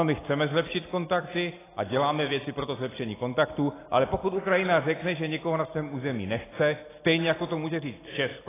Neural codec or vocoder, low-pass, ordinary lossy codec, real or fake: none; 3.6 kHz; AAC, 16 kbps; real